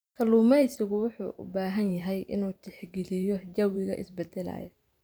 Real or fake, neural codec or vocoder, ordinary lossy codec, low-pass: fake; vocoder, 44.1 kHz, 128 mel bands every 512 samples, BigVGAN v2; none; none